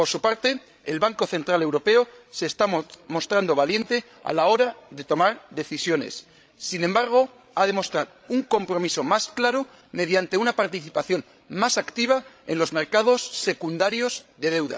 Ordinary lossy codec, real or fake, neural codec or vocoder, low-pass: none; fake; codec, 16 kHz, 16 kbps, FreqCodec, larger model; none